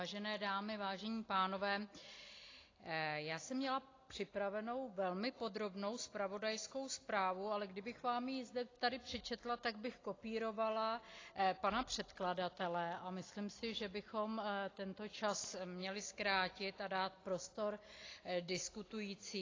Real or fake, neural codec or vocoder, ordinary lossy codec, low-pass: real; none; AAC, 32 kbps; 7.2 kHz